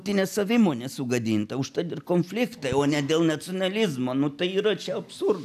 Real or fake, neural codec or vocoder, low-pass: real; none; 14.4 kHz